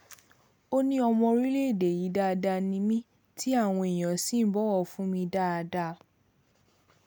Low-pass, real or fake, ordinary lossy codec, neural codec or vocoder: none; real; none; none